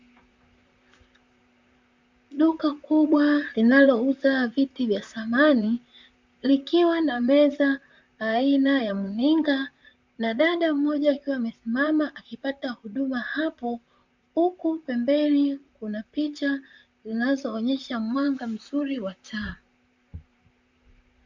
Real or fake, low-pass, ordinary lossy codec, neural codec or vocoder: fake; 7.2 kHz; MP3, 64 kbps; vocoder, 44.1 kHz, 128 mel bands, Pupu-Vocoder